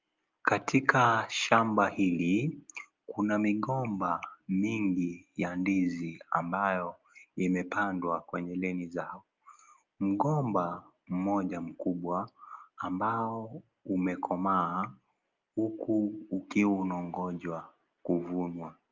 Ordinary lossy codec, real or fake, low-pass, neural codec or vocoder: Opus, 24 kbps; real; 7.2 kHz; none